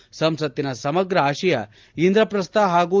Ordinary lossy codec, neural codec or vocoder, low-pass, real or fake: Opus, 32 kbps; none; 7.2 kHz; real